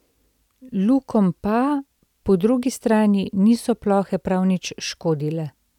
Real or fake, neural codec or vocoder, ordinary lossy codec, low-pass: real; none; none; 19.8 kHz